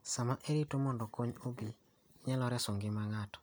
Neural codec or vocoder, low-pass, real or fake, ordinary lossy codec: none; none; real; none